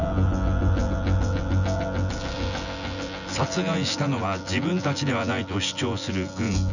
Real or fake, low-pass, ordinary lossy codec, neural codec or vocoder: fake; 7.2 kHz; none; vocoder, 24 kHz, 100 mel bands, Vocos